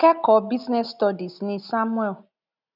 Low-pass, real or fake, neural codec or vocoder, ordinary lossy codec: 5.4 kHz; real; none; none